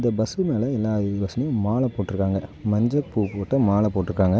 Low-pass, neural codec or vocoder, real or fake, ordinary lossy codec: none; none; real; none